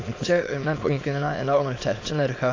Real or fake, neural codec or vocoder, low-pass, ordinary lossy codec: fake; autoencoder, 22.05 kHz, a latent of 192 numbers a frame, VITS, trained on many speakers; 7.2 kHz; AAC, 32 kbps